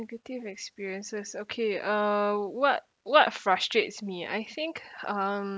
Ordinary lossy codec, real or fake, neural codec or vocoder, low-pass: none; real; none; none